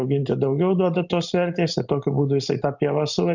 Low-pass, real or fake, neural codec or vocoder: 7.2 kHz; real; none